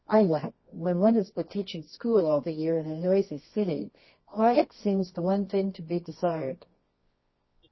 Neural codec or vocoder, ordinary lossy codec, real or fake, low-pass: codec, 24 kHz, 0.9 kbps, WavTokenizer, medium music audio release; MP3, 24 kbps; fake; 7.2 kHz